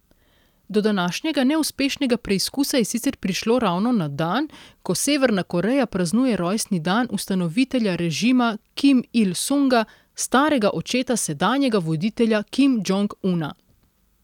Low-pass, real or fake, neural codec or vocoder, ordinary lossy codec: 19.8 kHz; real; none; none